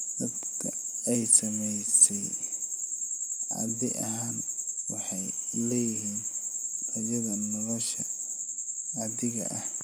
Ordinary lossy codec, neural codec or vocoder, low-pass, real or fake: none; none; none; real